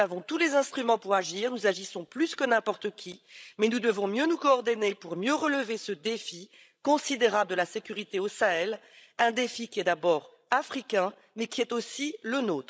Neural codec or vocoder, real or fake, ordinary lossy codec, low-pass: codec, 16 kHz, 16 kbps, FreqCodec, larger model; fake; none; none